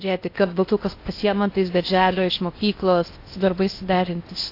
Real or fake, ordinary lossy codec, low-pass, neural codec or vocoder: fake; AAC, 32 kbps; 5.4 kHz; codec, 16 kHz in and 24 kHz out, 0.6 kbps, FocalCodec, streaming, 4096 codes